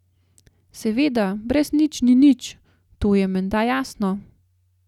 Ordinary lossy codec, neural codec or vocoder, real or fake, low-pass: none; none; real; 19.8 kHz